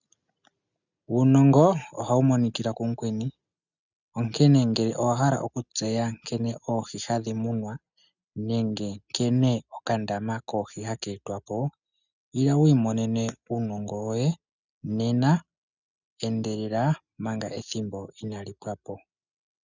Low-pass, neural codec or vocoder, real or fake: 7.2 kHz; none; real